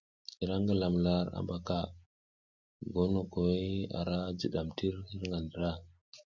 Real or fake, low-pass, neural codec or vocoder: real; 7.2 kHz; none